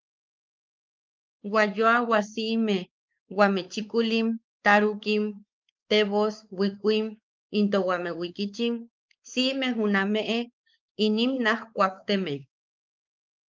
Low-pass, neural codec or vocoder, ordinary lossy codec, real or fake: 7.2 kHz; codec, 16 kHz, 4 kbps, X-Codec, WavLM features, trained on Multilingual LibriSpeech; Opus, 24 kbps; fake